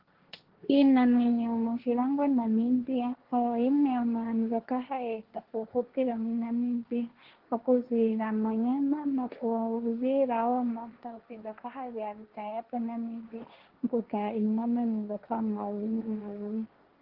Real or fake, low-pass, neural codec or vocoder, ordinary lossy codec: fake; 5.4 kHz; codec, 16 kHz, 1.1 kbps, Voila-Tokenizer; Opus, 16 kbps